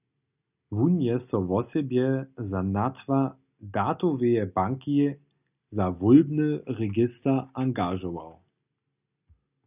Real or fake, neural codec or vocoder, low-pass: real; none; 3.6 kHz